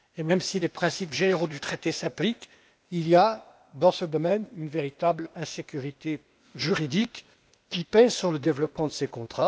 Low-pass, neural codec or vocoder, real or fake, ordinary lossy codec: none; codec, 16 kHz, 0.8 kbps, ZipCodec; fake; none